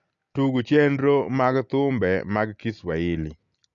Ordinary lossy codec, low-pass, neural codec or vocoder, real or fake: none; 7.2 kHz; none; real